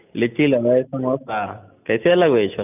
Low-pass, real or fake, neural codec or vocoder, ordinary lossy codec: 3.6 kHz; real; none; none